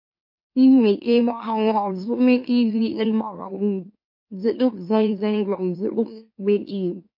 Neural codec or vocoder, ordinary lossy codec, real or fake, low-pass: autoencoder, 44.1 kHz, a latent of 192 numbers a frame, MeloTTS; MP3, 32 kbps; fake; 5.4 kHz